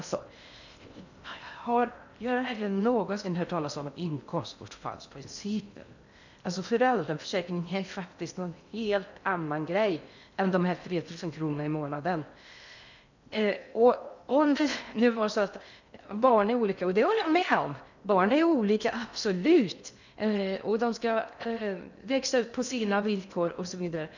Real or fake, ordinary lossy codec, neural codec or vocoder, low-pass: fake; none; codec, 16 kHz in and 24 kHz out, 0.6 kbps, FocalCodec, streaming, 2048 codes; 7.2 kHz